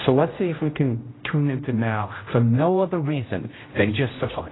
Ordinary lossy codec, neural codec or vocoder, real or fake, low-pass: AAC, 16 kbps; codec, 16 kHz, 0.5 kbps, X-Codec, HuBERT features, trained on general audio; fake; 7.2 kHz